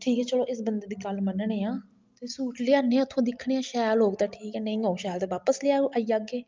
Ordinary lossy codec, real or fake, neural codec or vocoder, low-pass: Opus, 24 kbps; real; none; 7.2 kHz